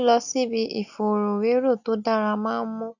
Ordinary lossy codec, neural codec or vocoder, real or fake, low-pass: none; none; real; 7.2 kHz